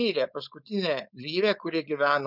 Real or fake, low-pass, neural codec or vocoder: fake; 5.4 kHz; codec, 16 kHz, 4.8 kbps, FACodec